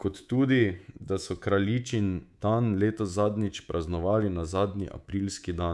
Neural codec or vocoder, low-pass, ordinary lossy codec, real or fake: codec, 24 kHz, 3.1 kbps, DualCodec; none; none; fake